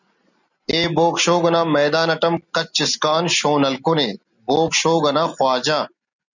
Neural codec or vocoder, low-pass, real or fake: none; 7.2 kHz; real